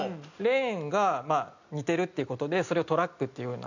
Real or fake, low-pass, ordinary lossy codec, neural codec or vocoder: real; 7.2 kHz; none; none